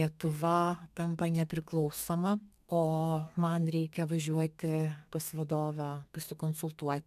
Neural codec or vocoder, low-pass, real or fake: codec, 32 kHz, 1.9 kbps, SNAC; 14.4 kHz; fake